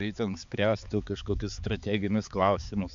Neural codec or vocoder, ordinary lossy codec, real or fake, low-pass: codec, 16 kHz, 4 kbps, X-Codec, HuBERT features, trained on balanced general audio; MP3, 64 kbps; fake; 7.2 kHz